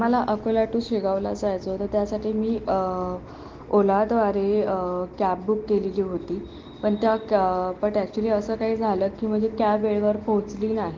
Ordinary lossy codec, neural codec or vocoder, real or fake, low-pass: Opus, 16 kbps; none; real; 7.2 kHz